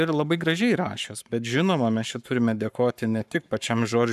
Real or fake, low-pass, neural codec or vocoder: fake; 14.4 kHz; codec, 44.1 kHz, 7.8 kbps, Pupu-Codec